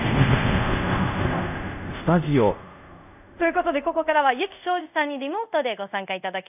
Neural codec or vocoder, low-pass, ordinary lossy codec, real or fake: codec, 24 kHz, 0.5 kbps, DualCodec; 3.6 kHz; none; fake